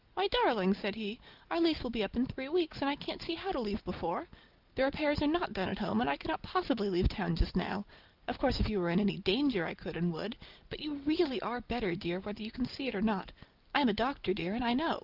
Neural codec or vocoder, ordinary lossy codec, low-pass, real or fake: none; Opus, 32 kbps; 5.4 kHz; real